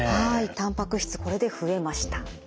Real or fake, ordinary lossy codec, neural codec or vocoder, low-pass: real; none; none; none